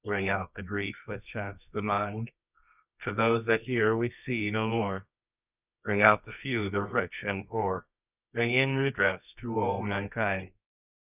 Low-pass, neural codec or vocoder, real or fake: 3.6 kHz; codec, 24 kHz, 0.9 kbps, WavTokenizer, medium music audio release; fake